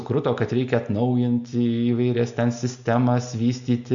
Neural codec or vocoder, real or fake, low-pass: none; real; 7.2 kHz